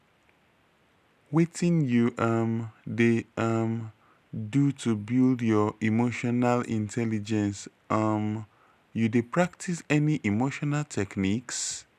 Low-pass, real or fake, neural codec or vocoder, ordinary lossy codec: 14.4 kHz; real; none; none